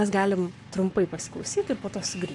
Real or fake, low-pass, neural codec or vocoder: fake; 10.8 kHz; codec, 44.1 kHz, 7.8 kbps, Pupu-Codec